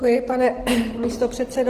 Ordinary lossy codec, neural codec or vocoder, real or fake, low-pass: Opus, 16 kbps; none; real; 14.4 kHz